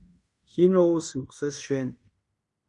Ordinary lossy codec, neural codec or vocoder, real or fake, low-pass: Opus, 64 kbps; codec, 16 kHz in and 24 kHz out, 0.9 kbps, LongCat-Audio-Codec, fine tuned four codebook decoder; fake; 10.8 kHz